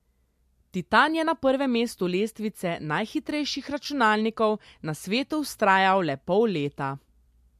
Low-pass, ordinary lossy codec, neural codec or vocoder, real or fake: 14.4 kHz; MP3, 64 kbps; none; real